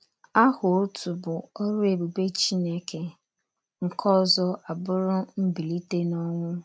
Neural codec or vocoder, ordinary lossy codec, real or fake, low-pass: none; none; real; none